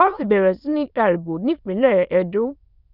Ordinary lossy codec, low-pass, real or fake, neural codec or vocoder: none; 5.4 kHz; fake; autoencoder, 22.05 kHz, a latent of 192 numbers a frame, VITS, trained on many speakers